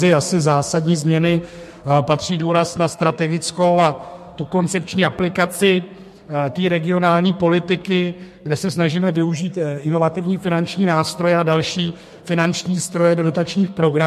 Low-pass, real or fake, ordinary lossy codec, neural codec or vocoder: 14.4 kHz; fake; MP3, 64 kbps; codec, 32 kHz, 1.9 kbps, SNAC